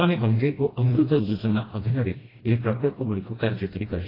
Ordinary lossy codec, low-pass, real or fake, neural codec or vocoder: AAC, 24 kbps; 5.4 kHz; fake; codec, 16 kHz, 1 kbps, FreqCodec, smaller model